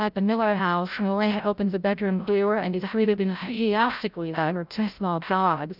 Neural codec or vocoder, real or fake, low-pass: codec, 16 kHz, 0.5 kbps, FreqCodec, larger model; fake; 5.4 kHz